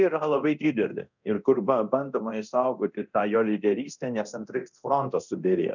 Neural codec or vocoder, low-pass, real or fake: codec, 24 kHz, 0.9 kbps, DualCodec; 7.2 kHz; fake